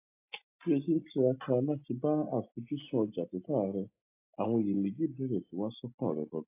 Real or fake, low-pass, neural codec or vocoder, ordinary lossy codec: real; 3.6 kHz; none; AAC, 24 kbps